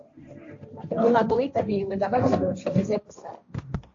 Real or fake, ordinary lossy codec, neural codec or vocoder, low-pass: fake; AAC, 48 kbps; codec, 16 kHz, 1.1 kbps, Voila-Tokenizer; 7.2 kHz